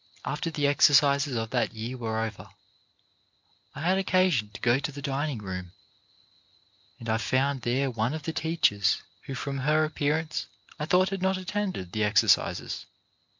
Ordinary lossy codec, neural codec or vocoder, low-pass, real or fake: MP3, 64 kbps; none; 7.2 kHz; real